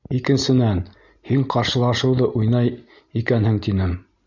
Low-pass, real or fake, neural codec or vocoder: 7.2 kHz; real; none